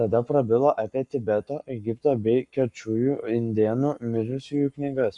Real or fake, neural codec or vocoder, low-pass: fake; codec, 44.1 kHz, 7.8 kbps, Pupu-Codec; 10.8 kHz